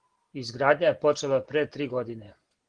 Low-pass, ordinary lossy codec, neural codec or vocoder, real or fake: 9.9 kHz; Opus, 16 kbps; none; real